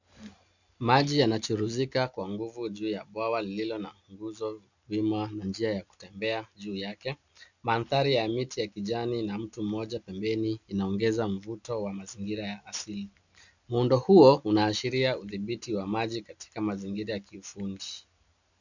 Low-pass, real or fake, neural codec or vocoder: 7.2 kHz; real; none